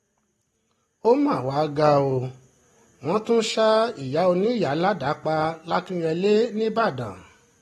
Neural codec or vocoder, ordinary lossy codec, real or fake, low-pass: none; AAC, 32 kbps; real; 19.8 kHz